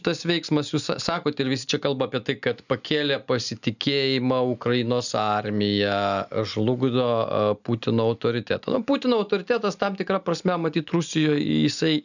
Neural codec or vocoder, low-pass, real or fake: none; 7.2 kHz; real